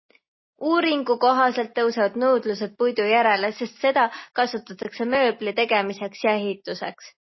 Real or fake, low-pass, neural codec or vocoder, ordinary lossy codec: real; 7.2 kHz; none; MP3, 24 kbps